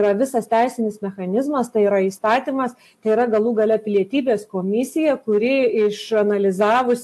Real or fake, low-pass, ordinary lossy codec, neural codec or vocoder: real; 14.4 kHz; AAC, 64 kbps; none